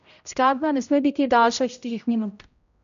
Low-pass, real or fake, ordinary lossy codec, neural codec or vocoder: 7.2 kHz; fake; none; codec, 16 kHz, 0.5 kbps, X-Codec, HuBERT features, trained on general audio